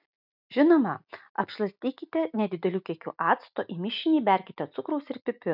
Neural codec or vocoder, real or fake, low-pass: none; real; 5.4 kHz